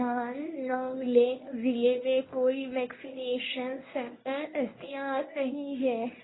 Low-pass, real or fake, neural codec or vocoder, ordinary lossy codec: 7.2 kHz; fake; codec, 24 kHz, 0.9 kbps, WavTokenizer, medium speech release version 1; AAC, 16 kbps